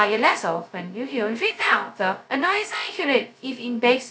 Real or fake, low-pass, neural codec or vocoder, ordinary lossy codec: fake; none; codec, 16 kHz, 0.2 kbps, FocalCodec; none